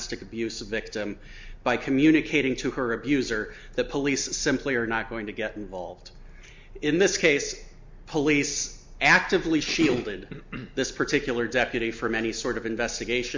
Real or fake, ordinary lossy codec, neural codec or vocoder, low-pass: real; MP3, 64 kbps; none; 7.2 kHz